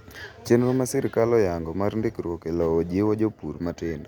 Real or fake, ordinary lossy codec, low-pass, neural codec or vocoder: real; none; 19.8 kHz; none